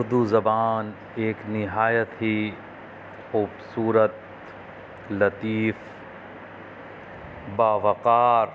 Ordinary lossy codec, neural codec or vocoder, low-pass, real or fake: none; none; none; real